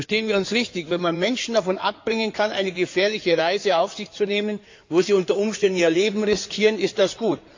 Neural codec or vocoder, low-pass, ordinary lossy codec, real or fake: codec, 16 kHz in and 24 kHz out, 2.2 kbps, FireRedTTS-2 codec; 7.2 kHz; AAC, 48 kbps; fake